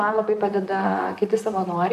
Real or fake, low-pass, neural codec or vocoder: fake; 14.4 kHz; vocoder, 44.1 kHz, 128 mel bands, Pupu-Vocoder